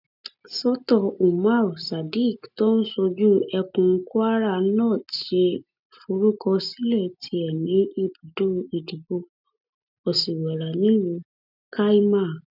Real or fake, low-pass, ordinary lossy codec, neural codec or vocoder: real; 5.4 kHz; none; none